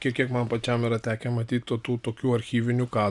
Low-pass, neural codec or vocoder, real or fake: 10.8 kHz; none; real